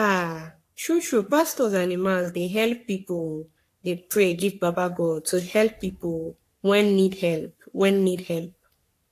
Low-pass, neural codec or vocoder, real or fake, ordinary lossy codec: 14.4 kHz; codec, 44.1 kHz, 3.4 kbps, Pupu-Codec; fake; AAC, 64 kbps